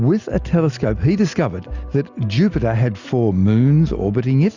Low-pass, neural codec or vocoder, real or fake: 7.2 kHz; none; real